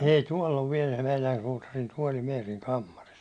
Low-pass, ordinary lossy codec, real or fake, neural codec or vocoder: 9.9 kHz; none; real; none